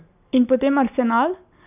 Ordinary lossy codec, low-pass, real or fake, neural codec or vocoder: none; 3.6 kHz; real; none